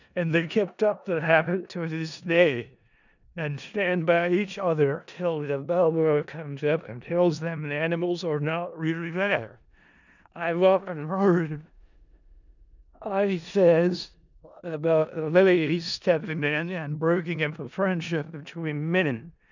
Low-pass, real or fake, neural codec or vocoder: 7.2 kHz; fake; codec, 16 kHz in and 24 kHz out, 0.4 kbps, LongCat-Audio-Codec, four codebook decoder